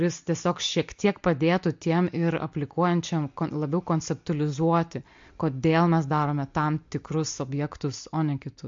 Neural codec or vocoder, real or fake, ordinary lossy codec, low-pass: none; real; MP3, 48 kbps; 7.2 kHz